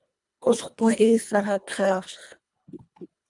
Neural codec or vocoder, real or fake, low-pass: codec, 24 kHz, 1.5 kbps, HILCodec; fake; 10.8 kHz